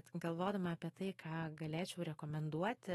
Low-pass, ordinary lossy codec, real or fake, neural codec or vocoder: 19.8 kHz; AAC, 32 kbps; fake; autoencoder, 48 kHz, 128 numbers a frame, DAC-VAE, trained on Japanese speech